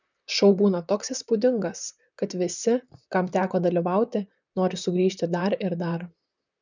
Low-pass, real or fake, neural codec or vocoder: 7.2 kHz; fake; vocoder, 44.1 kHz, 128 mel bands, Pupu-Vocoder